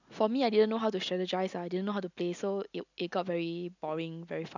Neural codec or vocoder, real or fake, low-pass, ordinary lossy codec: none; real; 7.2 kHz; none